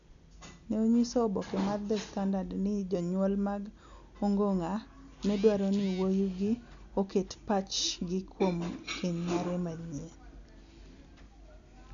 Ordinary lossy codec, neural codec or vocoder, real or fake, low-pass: none; none; real; 7.2 kHz